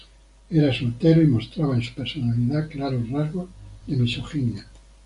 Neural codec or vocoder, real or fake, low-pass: none; real; 10.8 kHz